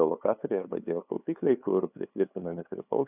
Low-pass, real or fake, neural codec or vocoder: 3.6 kHz; fake; codec, 16 kHz, 2 kbps, FunCodec, trained on LibriTTS, 25 frames a second